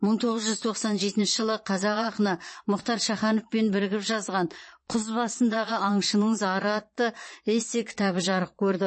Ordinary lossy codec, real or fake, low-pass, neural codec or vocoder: MP3, 32 kbps; fake; 9.9 kHz; vocoder, 22.05 kHz, 80 mel bands, Vocos